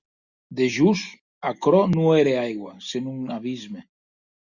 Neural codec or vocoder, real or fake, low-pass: none; real; 7.2 kHz